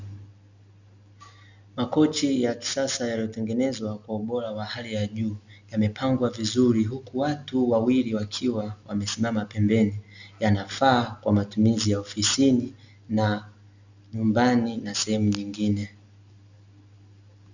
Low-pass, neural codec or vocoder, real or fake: 7.2 kHz; none; real